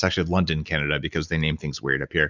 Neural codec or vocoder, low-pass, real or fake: none; 7.2 kHz; real